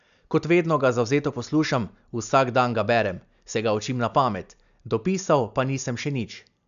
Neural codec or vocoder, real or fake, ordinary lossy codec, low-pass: none; real; none; 7.2 kHz